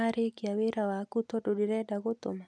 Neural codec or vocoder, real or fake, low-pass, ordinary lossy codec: none; real; none; none